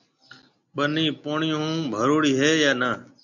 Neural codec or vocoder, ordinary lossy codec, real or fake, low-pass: none; MP3, 64 kbps; real; 7.2 kHz